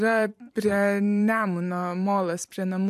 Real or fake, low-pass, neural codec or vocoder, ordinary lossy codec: fake; 14.4 kHz; vocoder, 44.1 kHz, 128 mel bands every 512 samples, BigVGAN v2; AAC, 96 kbps